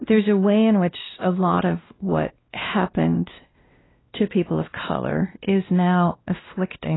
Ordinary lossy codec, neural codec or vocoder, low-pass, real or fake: AAC, 16 kbps; codec, 16 kHz, 1 kbps, X-Codec, WavLM features, trained on Multilingual LibriSpeech; 7.2 kHz; fake